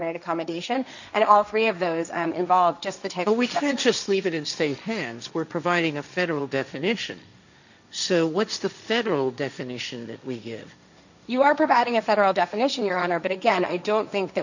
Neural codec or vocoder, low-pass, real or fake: codec, 16 kHz, 1.1 kbps, Voila-Tokenizer; 7.2 kHz; fake